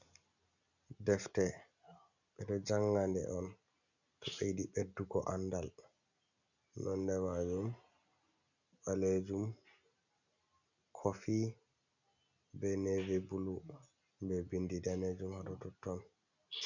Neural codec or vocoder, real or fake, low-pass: none; real; 7.2 kHz